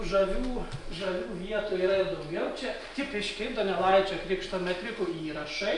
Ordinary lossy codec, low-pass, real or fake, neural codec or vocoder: Opus, 64 kbps; 10.8 kHz; real; none